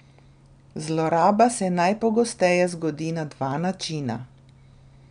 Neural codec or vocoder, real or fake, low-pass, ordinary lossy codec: none; real; 9.9 kHz; none